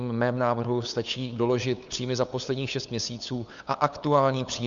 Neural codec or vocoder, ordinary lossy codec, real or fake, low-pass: codec, 16 kHz, 8 kbps, FunCodec, trained on LibriTTS, 25 frames a second; Opus, 64 kbps; fake; 7.2 kHz